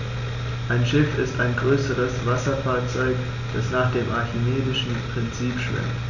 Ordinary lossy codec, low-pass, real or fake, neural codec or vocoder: none; 7.2 kHz; real; none